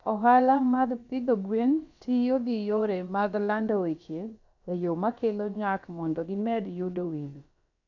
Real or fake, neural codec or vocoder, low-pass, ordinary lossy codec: fake; codec, 16 kHz, about 1 kbps, DyCAST, with the encoder's durations; 7.2 kHz; none